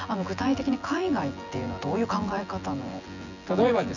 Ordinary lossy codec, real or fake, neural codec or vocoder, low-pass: none; fake; vocoder, 24 kHz, 100 mel bands, Vocos; 7.2 kHz